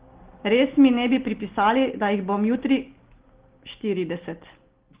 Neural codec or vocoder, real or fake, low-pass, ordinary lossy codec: none; real; 3.6 kHz; Opus, 16 kbps